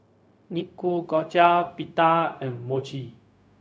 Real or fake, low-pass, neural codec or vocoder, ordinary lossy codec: fake; none; codec, 16 kHz, 0.4 kbps, LongCat-Audio-Codec; none